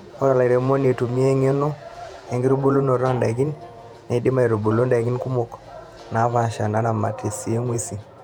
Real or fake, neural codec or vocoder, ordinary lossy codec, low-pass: fake; vocoder, 48 kHz, 128 mel bands, Vocos; none; 19.8 kHz